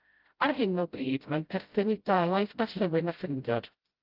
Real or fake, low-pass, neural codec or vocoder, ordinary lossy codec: fake; 5.4 kHz; codec, 16 kHz, 0.5 kbps, FreqCodec, smaller model; Opus, 32 kbps